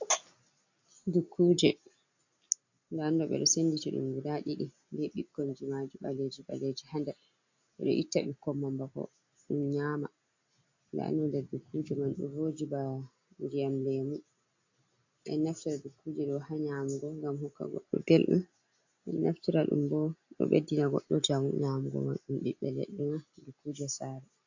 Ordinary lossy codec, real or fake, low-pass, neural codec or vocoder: AAC, 48 kbps; real; 7.2 kHz; none